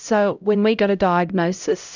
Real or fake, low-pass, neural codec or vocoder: fake; 7.2 kHz; codec, 16 kHz, 0.5 kbps, X-Codec, HuBERT features, trained on LibriSpeech